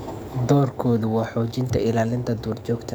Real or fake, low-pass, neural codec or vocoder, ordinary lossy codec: fake; none; codec, 44.1 kHz, 7.8 kbps, DAC; none